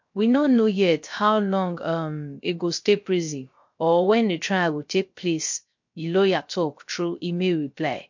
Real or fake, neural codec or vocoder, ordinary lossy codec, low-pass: fake; codec, 16 kHz, 0.3 kbps, FocalCodec; MP3, 48 kbps; 7.2 kHz